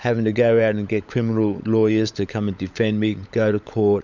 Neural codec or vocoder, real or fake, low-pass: codec, 16 kHz, 16 kbps, FunCodec, trained on LibriTTS, 50 frames a second; fake; 7.2 kHz